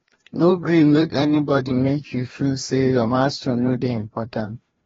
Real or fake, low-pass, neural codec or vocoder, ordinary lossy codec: fake; 7.2 kHz; codec, 16 kHz, 1 kbps, FreqCodec, larger model; AAC, 24 kbps